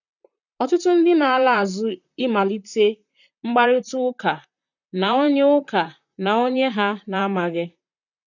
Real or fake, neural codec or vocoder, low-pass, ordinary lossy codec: fake; vocoder, 44.1 kHz, 128 mel bands, Pupu-Vocoder; 7.2 kHz; none